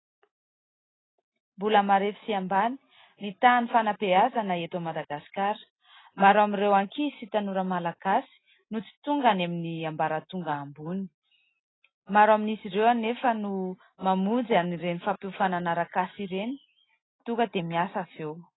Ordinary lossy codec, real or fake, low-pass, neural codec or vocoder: AAC, 16 kbps; real; 7.2 kHz; none